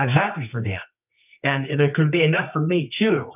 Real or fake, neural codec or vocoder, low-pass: fake; codec, 24 kHz, 0.9 kbps, WavTokenizer, medium music audio release; 3.6 kHz